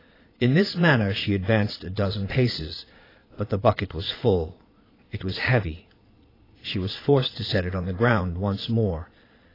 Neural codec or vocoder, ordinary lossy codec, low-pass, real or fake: vocoder, 22.05 kHz, 80 mel bands, Vocos; AAC, 24 kbps; 5.4 kHz; fake